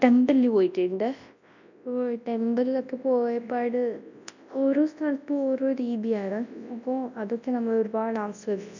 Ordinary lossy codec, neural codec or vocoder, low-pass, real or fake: none; codec, 24 kHz, 0.9 kbps, WavTokenizer, large speech release; 7.2 kHz; fake